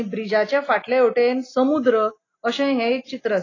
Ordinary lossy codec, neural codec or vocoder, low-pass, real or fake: AAC, 32 kbps; none; 7.2 kHz; real